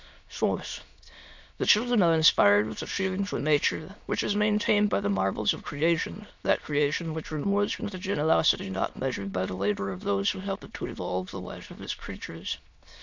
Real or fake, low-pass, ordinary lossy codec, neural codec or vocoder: fake; 7.2 kHz; MP3, 64 kbps; autoencoder, 22.05 kHz, a latent of 192 numbers a frame, VITS, trained on many speakers